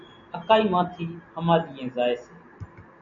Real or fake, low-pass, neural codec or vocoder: real; 7.2 kHz; none